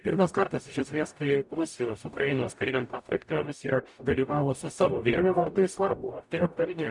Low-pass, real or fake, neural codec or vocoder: 10.8 kHz; fake; codec, 44.1 kHz, 0.9 kbps, DAC